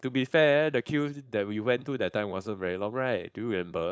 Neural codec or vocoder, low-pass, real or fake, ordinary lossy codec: codec, 16 kHz, 4.8 kbps, FACodec; none; fake; none